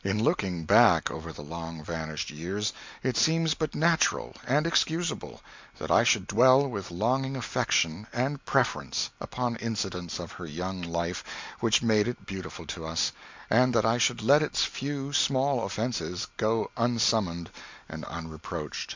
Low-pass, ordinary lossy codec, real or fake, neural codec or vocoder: 7.2 kHz; MP3, 64 kbps; real; none